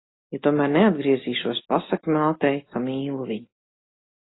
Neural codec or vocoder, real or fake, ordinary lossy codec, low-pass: none; real; AAC, 16 kbps; 7.2 kHz